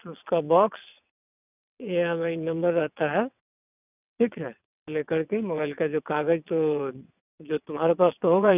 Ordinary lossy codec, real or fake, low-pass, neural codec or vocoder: none; fake; 3.6 kHz; vocoder, 22.05 kHz, 80 mel bands, WaveNeXt